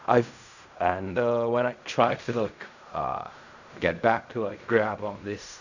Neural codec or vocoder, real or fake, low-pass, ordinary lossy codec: codec, 16 kHz in and 24 kHz out, 0.4 kbps, LongCat-Audio-Codec, fine tuned four codebook decoder; fake; 7.2 kHz; none